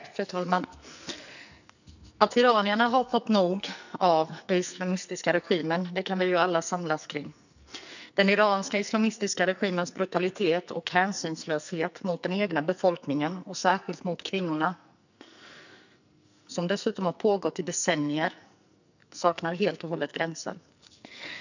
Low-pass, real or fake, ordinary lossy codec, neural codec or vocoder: 7.2 kHz; fake; none; codec, 44.1 kHz, 2.6 kbps, SNAC